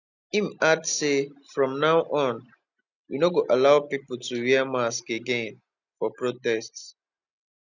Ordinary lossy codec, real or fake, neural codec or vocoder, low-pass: none; real; none; 7.2 kHz